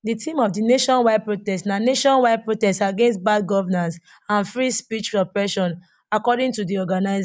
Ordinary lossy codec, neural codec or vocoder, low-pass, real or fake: none; none; none; real